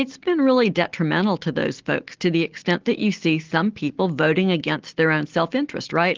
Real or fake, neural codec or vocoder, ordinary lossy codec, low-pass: real; none; Opus, 16 kbps; 7.2 kHz